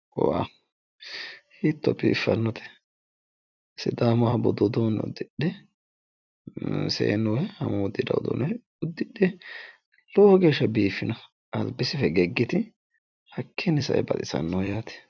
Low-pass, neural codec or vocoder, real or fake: 7.2 kHz; vocoder, 44.1 kHz, 128 mel bands every 256 samples, BigVGAN v2; fake